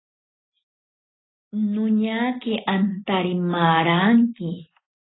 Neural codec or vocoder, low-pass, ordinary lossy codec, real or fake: none; 7.2 kHz; AAC, 16 kbps; real